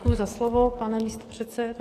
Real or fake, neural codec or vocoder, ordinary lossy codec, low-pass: fake; codec, 44.1 kHz, 7.8 kbps, DAC; AAC, 48 kbps; 14.4 kHz